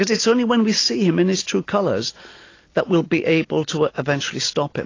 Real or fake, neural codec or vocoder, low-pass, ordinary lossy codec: real; none; 7.2 kHz; AAC, 32 kbps